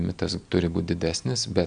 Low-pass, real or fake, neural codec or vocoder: 9.9 kHz; real; none